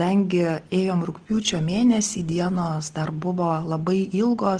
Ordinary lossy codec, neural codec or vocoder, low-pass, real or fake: Opus, 16 kbps; none; 9.9 kHz; real